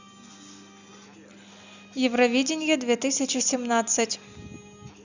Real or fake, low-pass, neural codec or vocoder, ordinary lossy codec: real; 7.2 kHz; none; Opus, 64 kbps